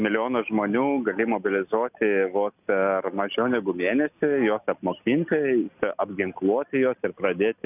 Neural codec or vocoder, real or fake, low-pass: none; real; 3.6 kHz